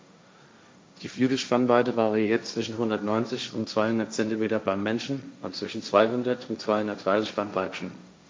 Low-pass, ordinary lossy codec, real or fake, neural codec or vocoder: none; none; fake; codec, 16 kHz, 1.1 kbps, Voila-Tokenizer